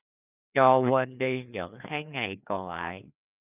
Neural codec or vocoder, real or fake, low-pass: codec, 16 kHz, 2 kbps, FreqCodec, larger model; fake; 3.6 kHz